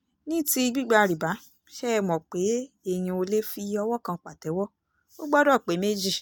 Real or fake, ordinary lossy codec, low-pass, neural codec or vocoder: real; none; none; none